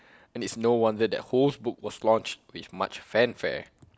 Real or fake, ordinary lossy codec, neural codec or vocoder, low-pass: real; none; none; none